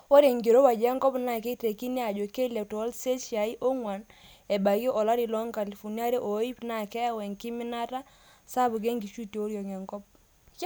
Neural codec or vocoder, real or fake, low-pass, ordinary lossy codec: none; real; none; none